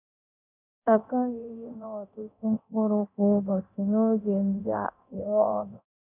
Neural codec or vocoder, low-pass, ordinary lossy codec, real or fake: codec, 24 kHz, 0.9 kbps, DualCodec; 3.6 kHz; none; fake